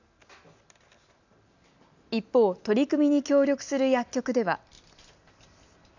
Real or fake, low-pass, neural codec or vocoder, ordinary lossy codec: real; 7.2 kHz; none; none